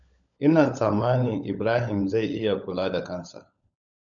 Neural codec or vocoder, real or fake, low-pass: codec, 16 kHz, 16 kbps, FunCodec, trained on LibriTTS, 50 frames a second; fake; 7.2 kHz